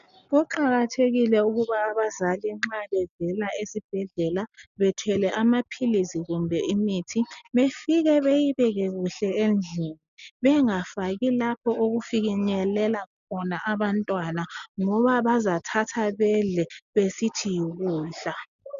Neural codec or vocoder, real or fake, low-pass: none; real; 7.2 kHz